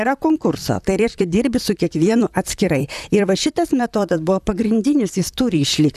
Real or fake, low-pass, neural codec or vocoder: fake; 14.4 kHz; vocoder, 44.1 kHz, 128 mel bands, Pupu-Vocoder